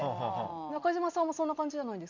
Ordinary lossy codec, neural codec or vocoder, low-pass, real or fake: none; none; 7.2 kHz; real